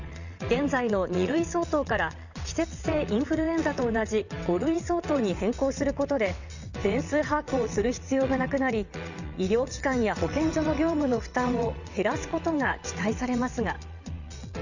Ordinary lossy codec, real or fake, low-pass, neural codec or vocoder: none; fake; 7.2 kHz; vocoder, 22.05 kHz, 80 mel bands, WaveNeXt